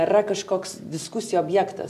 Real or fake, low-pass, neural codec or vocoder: real; 14.4 kHz; none